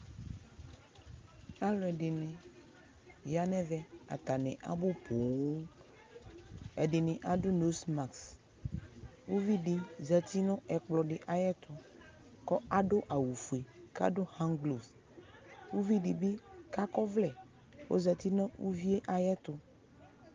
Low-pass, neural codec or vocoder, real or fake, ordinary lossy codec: 7.2 kHz; none; real; Opus, 24 kbps